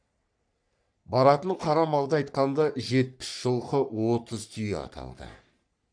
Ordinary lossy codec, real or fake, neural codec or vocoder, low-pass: none; fake; codec, 44.1 kHz, 3.4 kbps, Pupu-Codec; 9.9 kHz